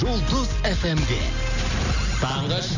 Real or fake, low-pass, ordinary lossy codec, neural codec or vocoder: real; 7.2 kHz; none; none